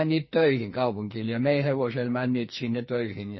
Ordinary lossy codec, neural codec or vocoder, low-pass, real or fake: MP3, 24 kbps; codec, 44.1 kHz, 2.6 kbps, SNAC; 7.2 kHz; fake